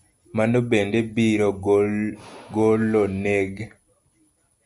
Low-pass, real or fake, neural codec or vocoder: 10.8 kHz; real; none